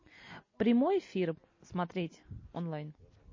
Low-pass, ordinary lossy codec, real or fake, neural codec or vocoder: 7.2 kHz; MP3, 32 kbps; real; none